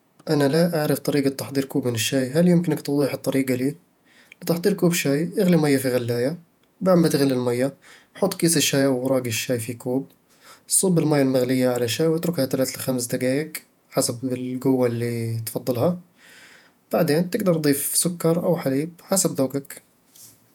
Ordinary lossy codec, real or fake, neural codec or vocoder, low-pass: none; fake; vocoder, 48 kHz, 128 mel bands, Vocos; 19.8 kHz